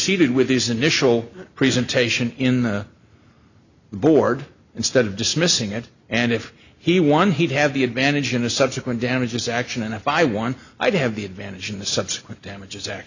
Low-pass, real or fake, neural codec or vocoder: 7.2 kHz; real; none